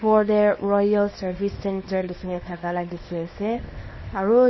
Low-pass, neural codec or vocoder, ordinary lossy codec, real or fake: 7.2 kHz; codec, 24 kHz, 0.9 kbps, WavTokenizer, small release; MP3, 24 kbps; fake